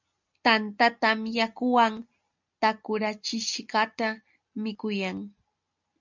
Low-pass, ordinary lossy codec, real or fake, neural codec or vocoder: 7.2 kHz; MP3, 64 kbps; real; none